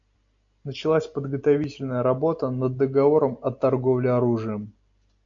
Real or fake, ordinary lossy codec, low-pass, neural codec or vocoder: real; MP3, 48 kbps; 7.2 kHz; none